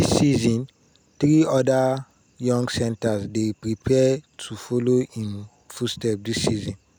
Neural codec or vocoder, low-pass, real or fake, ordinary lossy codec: none; none; real; none